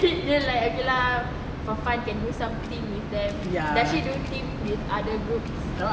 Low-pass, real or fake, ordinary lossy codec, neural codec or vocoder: none; real; none; none